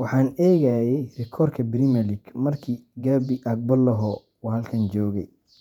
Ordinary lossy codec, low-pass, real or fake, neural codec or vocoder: none; 19.8 kHz; fake; vocoder, 48 kHz, 128 mel bands, Vocos